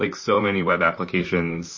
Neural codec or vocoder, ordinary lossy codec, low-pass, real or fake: vocoder, 44.1 kHz, 128 mel bands, Pupu-Vocoder; MP3, 32 kbps; 7.2 kHz; fake